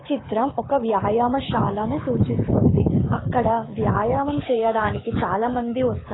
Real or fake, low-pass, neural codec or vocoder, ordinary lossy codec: fake; 7.2 kHz; codec, 24 kHz, 6 kbps, HILCodec; AAC, 16 kbps